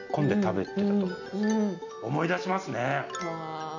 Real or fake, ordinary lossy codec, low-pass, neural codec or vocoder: real; none; 7.2 kHz; none